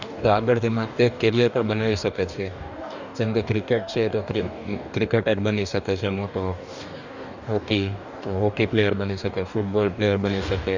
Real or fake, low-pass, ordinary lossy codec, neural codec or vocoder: fake; 7.2 kHz; none; codec, 44.1 kHz, 2.6 kbps, DAC